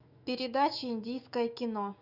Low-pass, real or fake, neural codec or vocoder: 5.4 kHz; fake; autoencoder, 48 kHz, 128 numbers a frame, DAC-VAE, trained on Japanese speech